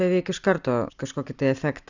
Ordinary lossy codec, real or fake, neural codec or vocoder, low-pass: Opus, 64 kbps; real; none; 7.2 kHz